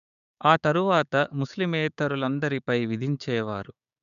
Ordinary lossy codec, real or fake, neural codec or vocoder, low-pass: none; fake; codec, 16 kHz, 6 kbps, DAC; 7.2 kHz